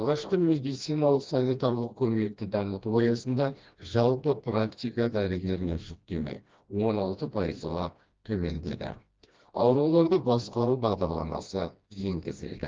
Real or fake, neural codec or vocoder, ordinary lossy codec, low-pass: fake; codec, 16 kHz, 1 kbps, FreqCodec, smaller model; Opus, 24 kbps; 7.2 kHz